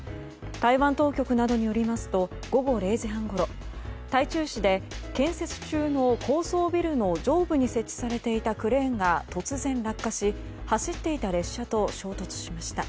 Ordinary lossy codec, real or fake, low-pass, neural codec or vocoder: none; real; none; none